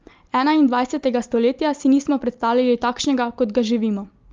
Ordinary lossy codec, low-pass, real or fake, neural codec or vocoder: Opus, 32 kbps; 7.2 kHz; real; none